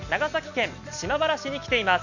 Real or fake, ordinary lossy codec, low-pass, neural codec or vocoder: real; none; 7.2 kHz; none